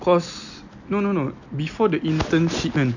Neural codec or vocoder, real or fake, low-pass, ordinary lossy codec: none; real; 7.2 kHz; none